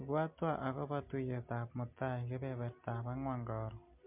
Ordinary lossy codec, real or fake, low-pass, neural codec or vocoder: MP3, 32 kbps; real; 3.6 kHz; none